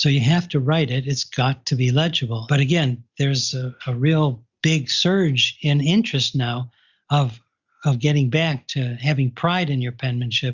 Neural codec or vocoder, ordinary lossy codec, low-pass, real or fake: none; Opus, 64 kbps; 7.2 kHz; real